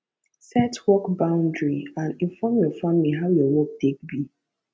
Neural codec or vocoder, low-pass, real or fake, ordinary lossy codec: none; none; real; none